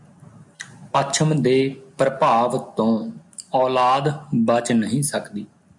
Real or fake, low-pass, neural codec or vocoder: real; 10.8 kHz; none